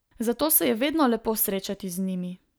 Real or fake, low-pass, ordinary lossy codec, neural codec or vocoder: real; none; none; none